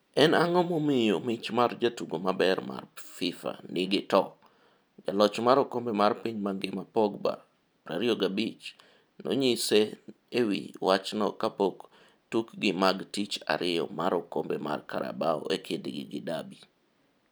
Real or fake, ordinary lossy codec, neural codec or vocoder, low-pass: real; none; none; none